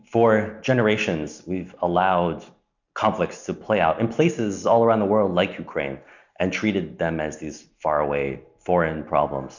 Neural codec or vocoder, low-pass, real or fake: none; 7.2 kHz; real